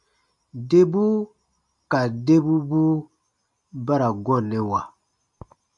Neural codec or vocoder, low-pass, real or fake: none; 10.8 kHz; real